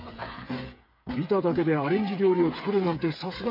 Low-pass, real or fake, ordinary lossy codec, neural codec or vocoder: 5.4 kHz; fake; MP3, 32 kbps; codec, 16 kHz, 8 kbps, FreqCodec, smaller model